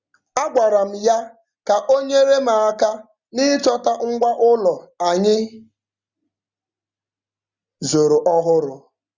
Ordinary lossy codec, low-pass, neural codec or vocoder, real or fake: Opus, 64 kbps; 7.2 kHz; none; real